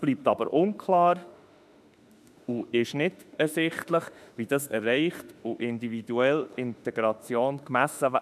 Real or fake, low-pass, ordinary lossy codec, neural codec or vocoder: fake; 14.4 kHz; none; autoencoder, 48 kHz, 32 numbers a frame, DAC-VAE, trained on Japanese speech